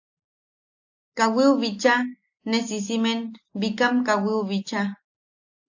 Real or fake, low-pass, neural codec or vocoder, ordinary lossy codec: real; 7.2 kHz; none; AAC, 48 kbps